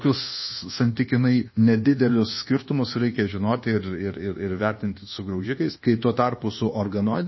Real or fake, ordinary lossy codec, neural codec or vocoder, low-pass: fake; MP3, 24 kbps; codec, 24 kHz, 1.2 kbps, DualCodec; 7.2 kHz